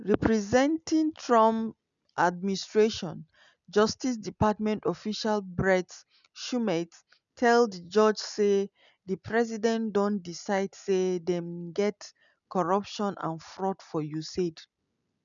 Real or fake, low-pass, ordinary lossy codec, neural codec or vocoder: real; 7.2 kHz; none; none